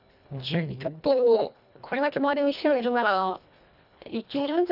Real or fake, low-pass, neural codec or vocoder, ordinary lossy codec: fake; 5.4 kHz; codec, 24 kHz, 1.5 kbps, HILCodec; none